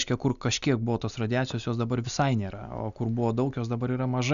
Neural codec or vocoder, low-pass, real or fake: none; 7.2 kHz; real